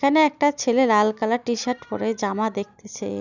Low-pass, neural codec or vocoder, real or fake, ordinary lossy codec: 7.2 kHz; none; real; none